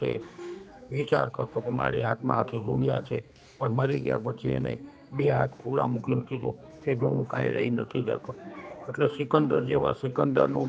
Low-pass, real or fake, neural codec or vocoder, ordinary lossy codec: none; fake; codec, 16 kHz, 2 kbps, X-Codec, HuBERT features, trained on general audio; none